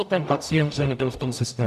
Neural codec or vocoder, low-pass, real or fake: codec, 44.1 kHz, 0.9 kbps, DAC; 14.4 kHz; fake